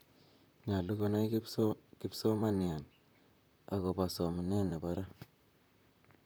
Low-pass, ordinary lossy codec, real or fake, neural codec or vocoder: none; none; fake; vocoder, 44.1 kHz, 128 mel bands, Pupu-Vocoder